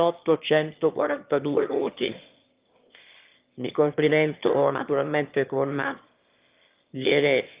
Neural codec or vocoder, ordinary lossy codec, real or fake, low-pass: autoencoder, 22.05 kHz, a latent of 192 numbers a frame, VITS, trained on one speaker; Opus, 32 kbps; fake; 3.6 kHz